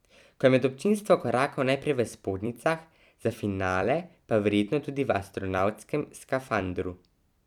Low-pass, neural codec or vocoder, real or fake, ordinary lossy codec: 19.8 kHz; vocoder, 44.1 kHz, 128 mel bands every 256 samples, BigVGAN v2; fake; none